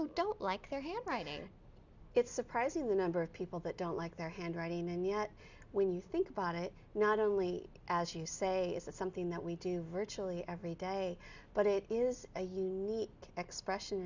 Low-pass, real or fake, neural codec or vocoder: 7.2 kHz; real; none